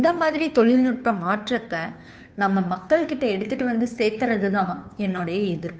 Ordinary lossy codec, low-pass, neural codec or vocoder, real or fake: none; none; codec, 16 kHz, 2 kbps, FunCodec, trained on Chinese and English, 25 frames a second; fake